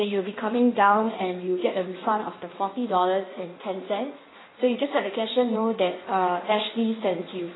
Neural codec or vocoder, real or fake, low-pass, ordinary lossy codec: codec, 16 kHz in and 24 kHz out, 1.1 kbps, FireRedTTS-2 codec; fake; 7.2 kHz; AAC, 16 kbps